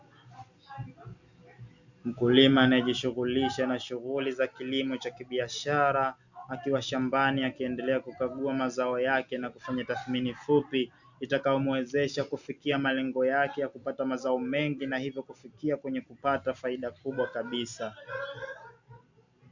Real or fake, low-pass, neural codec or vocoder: real; 7.2 kHz; none